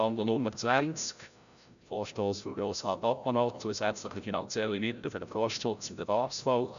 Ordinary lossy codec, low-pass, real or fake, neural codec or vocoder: none; 7.2 kHz; fake; codec, 16 kHz, 0.5 kbps, FreqCodec, larger model